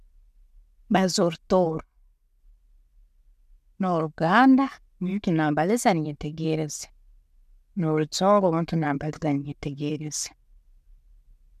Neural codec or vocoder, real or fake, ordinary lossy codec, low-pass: none; real; MP3, 96 kbps; 14.4 kHz